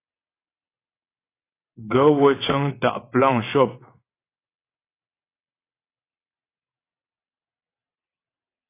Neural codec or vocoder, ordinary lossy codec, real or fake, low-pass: vocoder, 22.05 kHz, 80 mel bands, WaveNeXt; AAC, 24 kbps; fake; 3.6 kHz